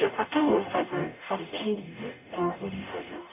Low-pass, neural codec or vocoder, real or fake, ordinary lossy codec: 3.6 kHz; codec, 44.1 kHz, 0.9 kbps, DAC; fake; none